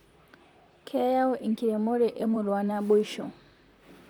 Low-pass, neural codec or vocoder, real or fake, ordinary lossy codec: none; vocoder, 44.1 kHz, 128 mel bands, Pupu-Vocoder; fake; none